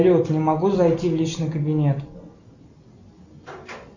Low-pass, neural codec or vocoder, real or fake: 7.2 kHz; none; real